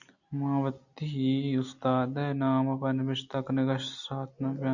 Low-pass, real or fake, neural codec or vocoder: 7.2 kHz; real; none